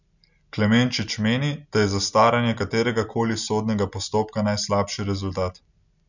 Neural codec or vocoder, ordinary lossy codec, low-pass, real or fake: none; none; 7.2 kHz; real